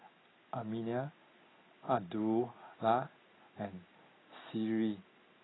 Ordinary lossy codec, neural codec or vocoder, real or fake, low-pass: AAC, 16 kbps; none; real; 7.2 kHz